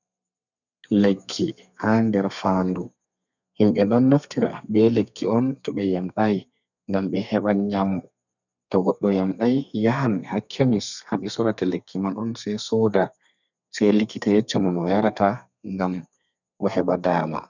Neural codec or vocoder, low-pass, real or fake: codec, 32 kHz, 1.9 kbps, SNAC; 7.2 kHz; fake